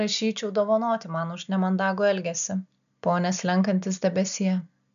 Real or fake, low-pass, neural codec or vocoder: real; 7.2 kHz; none